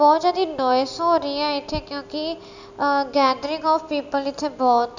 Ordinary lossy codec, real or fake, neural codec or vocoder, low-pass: none; real; none; 7.2 kHz